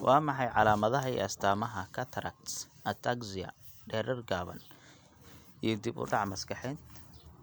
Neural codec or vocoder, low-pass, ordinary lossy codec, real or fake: none; none; none; real